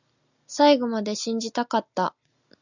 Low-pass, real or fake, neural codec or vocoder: 7.2 kHz; real; none